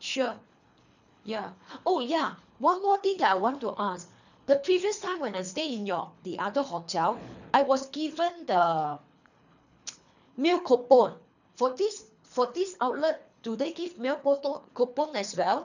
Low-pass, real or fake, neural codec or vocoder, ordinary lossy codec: 7.2 kHz; fake; codec, 24 kHz, 3 kbps, HILCodec; none